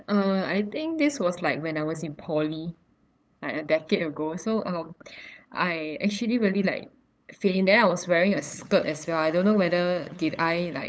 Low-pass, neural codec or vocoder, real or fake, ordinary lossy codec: none; codec, 16 kHz, 8 kbps, FunCodec, trained on LibriTTS, 25 frames a second; fake; none